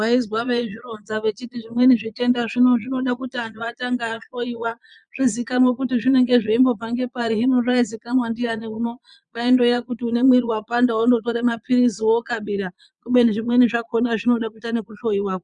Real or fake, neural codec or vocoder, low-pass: fake; vocoder, 22.05 kHz, 80 mel bands, Vocos; 9.9 kHz